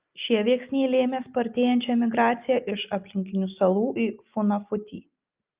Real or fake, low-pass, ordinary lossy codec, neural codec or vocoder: real; 3.6 kHz; Opus, 24 kbps; none